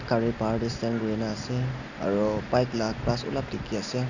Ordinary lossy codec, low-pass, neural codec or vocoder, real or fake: none; 7.2 kHz; none; real